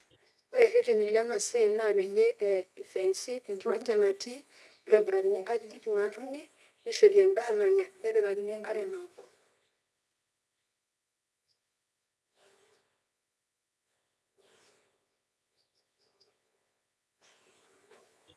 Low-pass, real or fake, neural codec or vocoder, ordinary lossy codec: none; fake; codec, 24 kHz, 0.9 kbps, WavTokenizer, medium music audio release; none